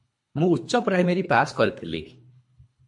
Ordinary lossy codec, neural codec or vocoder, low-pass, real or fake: MP3, 48 kbps; codec, 24 kHz, 3 kbps, HILCodec; 10.8 kHz; fake